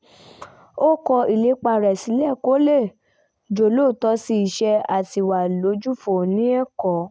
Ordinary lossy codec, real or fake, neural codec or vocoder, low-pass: none; real; none; none